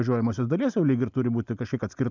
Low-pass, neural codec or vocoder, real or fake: 7.2 kHz; none; real